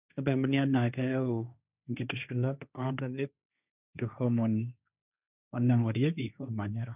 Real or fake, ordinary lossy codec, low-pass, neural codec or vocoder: fake; none; 3.6 kHz; codec, 16 kHz, 1.1 kbps, Voila-Tokenizer